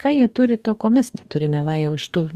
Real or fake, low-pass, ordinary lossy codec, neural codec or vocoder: fake; 14.4 kHz; Opus, 64 kbps; codec, 44.1 kHz, 2.6 kbps, DAC